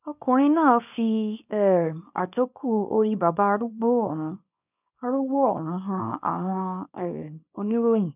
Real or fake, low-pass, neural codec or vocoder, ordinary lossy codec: fake; 3.6 kHz; codec, 24 kHz, 0.9 kbps, WavTokenizer, small release; none